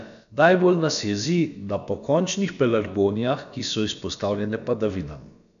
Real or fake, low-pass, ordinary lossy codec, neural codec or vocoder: fake; 7.2 kHz; none; codec, 16 kHz, about 1 kbps, DyCAST, with the encoder's durations